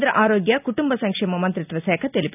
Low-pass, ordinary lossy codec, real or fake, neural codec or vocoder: 3.6 kHz; none; real; none